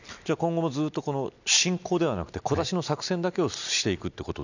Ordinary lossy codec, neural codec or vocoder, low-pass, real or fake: none; none; 7.2 kHz; real